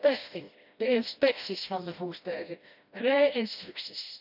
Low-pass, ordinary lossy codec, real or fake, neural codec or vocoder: 5.4 kHz; none; fake; codec, 16 kHz, 1 kbps, FreqCodec, smaller model